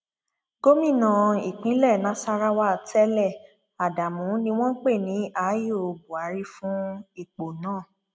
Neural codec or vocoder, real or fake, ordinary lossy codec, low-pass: none; real; none; none